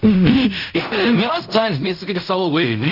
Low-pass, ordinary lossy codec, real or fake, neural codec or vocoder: 5.4 kHz; none; fake; codec, 16 kHz in and 24 kHz out, 0.4 kbps, LongCat-Audio-Codec, fine tuned four codebook decoder